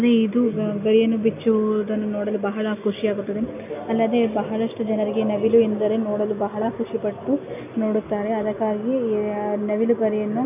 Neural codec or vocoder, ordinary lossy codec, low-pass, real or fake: none; none; 3.6 kHz; real